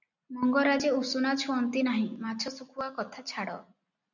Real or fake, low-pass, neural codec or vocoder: real; 7.2 kHz; none